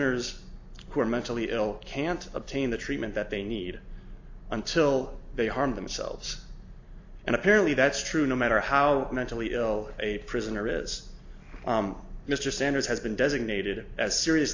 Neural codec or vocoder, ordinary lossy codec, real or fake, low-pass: none; AAC, 48 kbps; real; 7.2 kHz